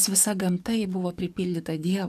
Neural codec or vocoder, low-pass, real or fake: codec, 44.1 kHz, 7.8 kbps, DAC; 14.4 kHz; fake